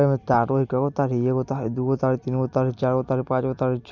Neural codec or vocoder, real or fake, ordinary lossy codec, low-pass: none; real; none; 7.2 kHz